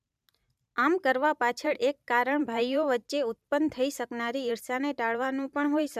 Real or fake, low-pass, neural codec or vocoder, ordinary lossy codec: fake; 14.4 kHz; vocoder, 44.1 kHz, 128 mel bands every 512 samples, BigVGAN v2; none